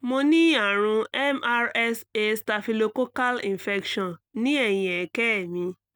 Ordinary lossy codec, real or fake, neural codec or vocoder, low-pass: none; real; none; none